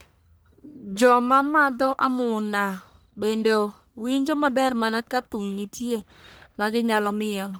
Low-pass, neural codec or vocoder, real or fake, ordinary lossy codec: none; codec, 44.1 kHz, 1.7 kbps, Pupu-Codec; fake; none